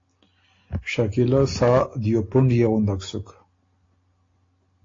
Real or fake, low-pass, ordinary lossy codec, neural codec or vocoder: real; 7.2 kHz; AAC, 32 kbps; none